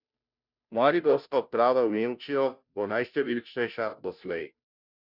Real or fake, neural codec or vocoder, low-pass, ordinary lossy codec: fake; codec, 16 kHz, 0.5 kbps, FunCodec, trained on Chinese and English, 25 frames a second; 5.4 kHz; none